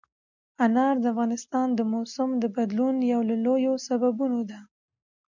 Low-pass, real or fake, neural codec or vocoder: 7.2 kHz; real; none